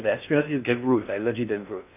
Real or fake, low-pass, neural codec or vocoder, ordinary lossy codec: fake; 3.6 kHz; codec, 16 kHz in and 24 kHz out, 0.8 kbps, FocalCodec, streaming, 65536 codes; none